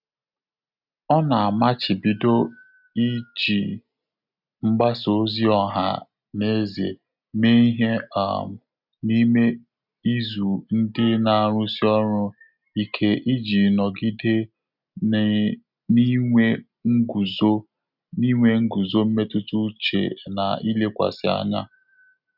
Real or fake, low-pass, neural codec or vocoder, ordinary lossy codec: real; 5.4 kHz; none; none